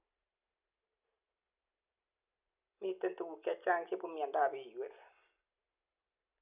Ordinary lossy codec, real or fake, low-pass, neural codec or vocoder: none; real; 3.6 kHz; none